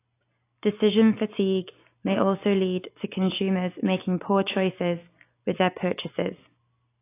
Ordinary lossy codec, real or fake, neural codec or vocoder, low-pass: AAC, 24 kbps; real; none; 3.6 kHz